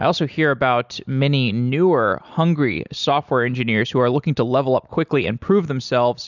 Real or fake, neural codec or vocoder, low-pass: real; none; 7.2 kHz